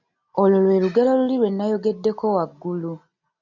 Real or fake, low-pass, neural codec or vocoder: real; 7.2 kHz; none